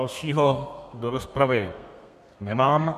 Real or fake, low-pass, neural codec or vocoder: fake; 14.4 kHz; codec, 32 kHz, 1.9 kbps, SNAC